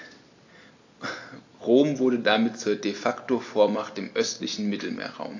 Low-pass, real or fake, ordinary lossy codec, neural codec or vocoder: 7.2 kHz; real; AAC, 48 kbps; none